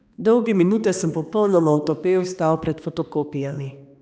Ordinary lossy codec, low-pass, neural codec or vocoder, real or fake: none; none; codec, 16 kHz, 2 kbps, X-Codec, HuBERT features, trained on balanced general audio; fake